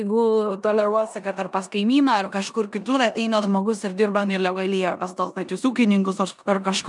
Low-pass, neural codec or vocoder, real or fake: 10.8 kHz; codec, 16 kHz in and 24 kHz out, 0.9 kbps, LongCat-Audio-Codec, four codebook decoder; fake